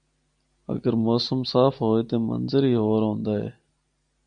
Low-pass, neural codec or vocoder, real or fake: 9.9 kHz; none; real